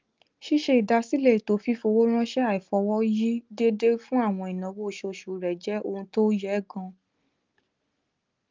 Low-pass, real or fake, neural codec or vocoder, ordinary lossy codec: 7.2 kHz; real; none; Opus, 32 kbps